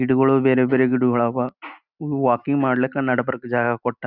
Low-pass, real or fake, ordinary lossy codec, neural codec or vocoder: 5.4 kHz; real; Opus, 64 kbps; none